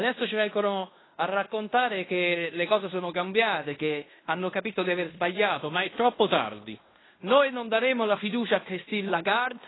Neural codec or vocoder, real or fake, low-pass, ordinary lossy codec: codec, 16 kHz in and 24 kHz out, 0.9 kbps, LongCat-Audio-Codec, fine tuned four codebook decoder; fake; 7.2 kHz; AAC, 16 kbps